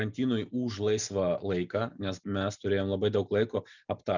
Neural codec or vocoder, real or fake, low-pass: none; real; 7.2 kHz